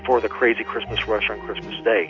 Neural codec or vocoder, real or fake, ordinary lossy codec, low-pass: none; real; MP3, 64 kbps; 7.2 kHz